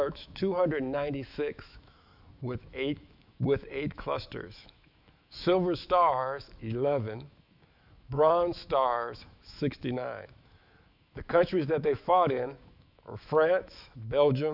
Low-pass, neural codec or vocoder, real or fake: 5.4 kHz; autoencoder, 48 kHz, 128 numbers a frame, DAC-VAE, trained on Japanese speech; fake